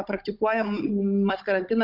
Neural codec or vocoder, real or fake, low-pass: codec, 16 kHz, 16 kbps, FunCodec, trained on Chinese and English, 50 frames a second; fake; 5.4 kHz